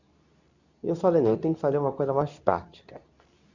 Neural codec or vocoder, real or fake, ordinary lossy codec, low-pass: codec, 24 kHz, 0.9 kbps, WavTokenizer, medium speech release version 2; fake; none; 7.2 kHz